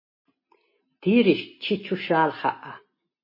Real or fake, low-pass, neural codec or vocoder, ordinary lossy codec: real; 5.4 kHz; none; MP3, 24 kbps